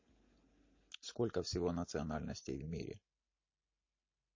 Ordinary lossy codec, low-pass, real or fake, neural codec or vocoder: MP3, 32 kbps; 7.2 kHz; real; none